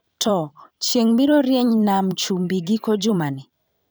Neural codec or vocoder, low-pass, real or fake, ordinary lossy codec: vocoder, 44.1 kHz, 128 mel bands every 512 samples, BigVGAN v2; none; fake; none